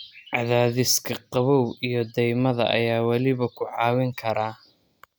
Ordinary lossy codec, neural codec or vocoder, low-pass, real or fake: none; none; none; real